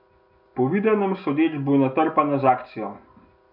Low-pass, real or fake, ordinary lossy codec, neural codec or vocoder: 5.4 kHz; real; none; none